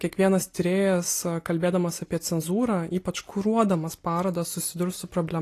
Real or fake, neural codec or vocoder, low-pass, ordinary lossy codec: real; none; 14.4 kHz; AAC, 48 kbps